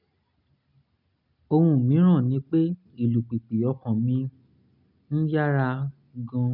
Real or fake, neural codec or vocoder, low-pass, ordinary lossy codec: real; none; 5.4 kHz; none